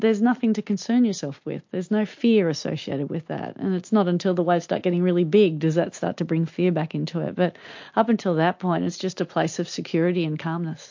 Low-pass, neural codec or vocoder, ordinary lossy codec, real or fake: 7.2 kHz; none; MP3, 48 kbps; real